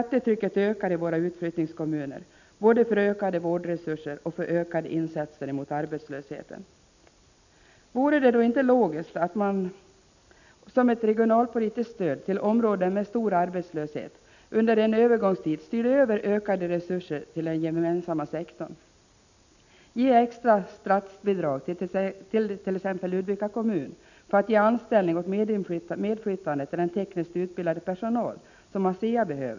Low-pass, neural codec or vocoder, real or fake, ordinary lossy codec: 7.2 kHz; none; real; none